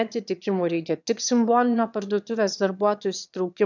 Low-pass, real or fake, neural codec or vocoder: 7.2 kHz; fake; autoencoder, 22.05 kHz, a latent of 192 numbers a frame, VITS, trained on one speaker